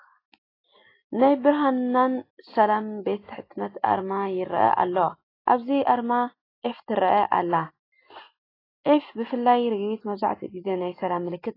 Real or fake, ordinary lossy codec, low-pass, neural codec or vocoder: real; AAC, 24 kbps; 5.4 kHz; none